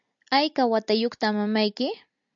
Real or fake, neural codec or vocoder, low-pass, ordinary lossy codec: real; none; 7.2 kHz; MP3, 64 kbps